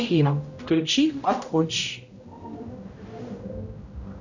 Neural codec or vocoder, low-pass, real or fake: codec, 16 kHz, 0.5 kbps, X-Codec, HuBERT features, trained on general audio; 7.2 kHz; fake